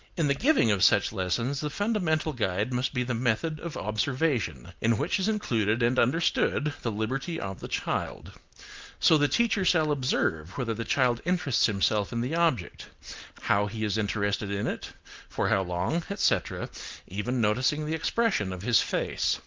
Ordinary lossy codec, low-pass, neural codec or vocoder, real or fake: Opus, 32 kbps; 7.2 kHz; none; real